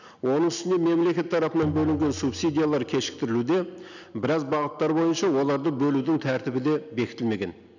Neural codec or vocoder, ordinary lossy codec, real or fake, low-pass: none; none; real; 7.2 kHz